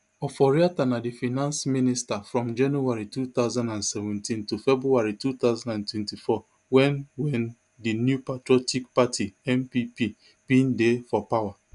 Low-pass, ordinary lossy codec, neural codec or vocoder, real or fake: 10.8 kHz; none; none; real